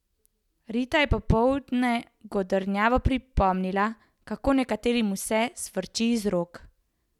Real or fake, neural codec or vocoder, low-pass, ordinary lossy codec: real; none; 19.8 kHz; none